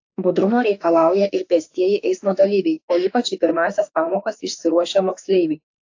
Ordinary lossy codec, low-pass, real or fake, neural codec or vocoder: AAC, 48 kbps; 7.2 kHz; fake; autoencoder, 48 kHz, 32 numbers a frame, DAC-VAE, trained on Japanese speech